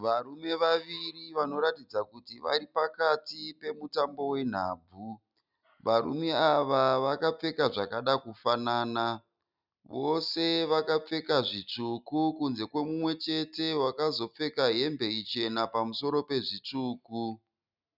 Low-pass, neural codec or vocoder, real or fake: 5.4 kHz; none; real